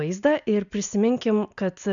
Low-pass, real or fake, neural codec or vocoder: 7.2 kHz; real; none